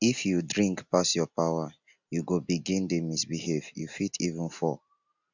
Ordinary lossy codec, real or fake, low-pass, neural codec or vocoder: none; real; 7.2 kHz; none